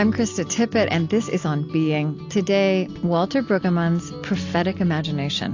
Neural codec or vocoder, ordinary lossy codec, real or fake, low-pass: none; AAC, 48 kbps; real; 7.2 kHz